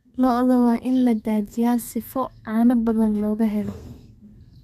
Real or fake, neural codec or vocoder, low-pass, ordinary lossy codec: fake; codec, 32 kHz, 1.9 kbps, SNAC; 14.4 kHz; none